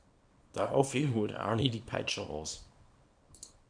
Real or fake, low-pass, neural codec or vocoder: fake; 9.9 kHz; codec, 24 kHz, 0.9 kbps, WavTokenizer, small release